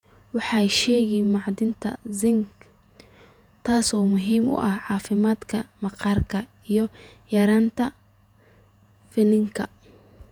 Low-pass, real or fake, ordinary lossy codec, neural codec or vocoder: 19.8 kHz; fake; none; vocoder, 48 kHz, 128 mel bands, Vocos